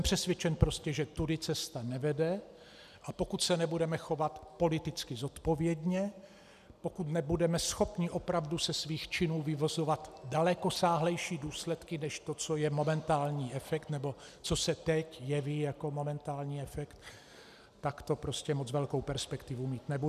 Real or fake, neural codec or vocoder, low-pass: real; none; 14.4 kHz